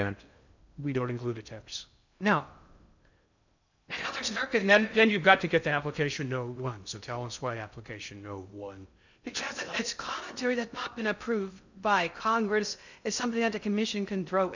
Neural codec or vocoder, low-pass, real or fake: codec, 16 kHz in and 24 kHz out, 0.6 kbps, FocalCodec, streaming, 2048 codes; 7.2 kHz; fake